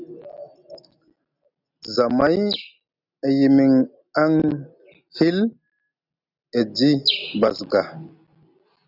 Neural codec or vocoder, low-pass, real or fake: none; 5.4 kHz; real